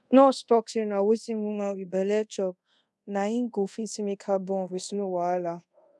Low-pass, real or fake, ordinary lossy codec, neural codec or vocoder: none; fake; none; codec, 24 kHz, 0.5 kbps, DualCodec